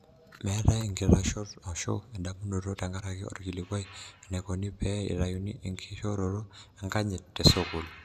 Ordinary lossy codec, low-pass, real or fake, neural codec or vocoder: none; 14.4 kHz; real; none